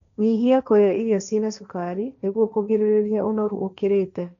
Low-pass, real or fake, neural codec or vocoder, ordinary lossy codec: 7.2 kHz; fake; codec, 16 kHz, 1.1 kbps, Voila-Tokenizer; none